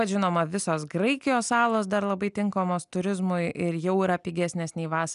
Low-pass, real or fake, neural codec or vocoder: 10.8 kHz; real; none